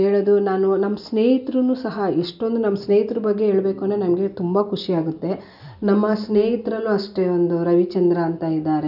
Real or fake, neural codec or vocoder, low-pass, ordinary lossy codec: real; none; 5.4 kHz; none